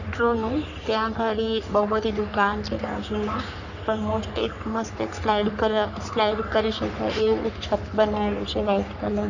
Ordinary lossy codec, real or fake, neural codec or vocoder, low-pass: none; fake; codec, 44.1 kHz, 3.4 kbps, Pupu-Codec; 7.2 kHz